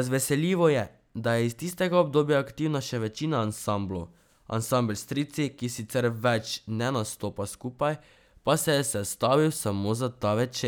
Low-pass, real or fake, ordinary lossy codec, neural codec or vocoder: none; real; none; none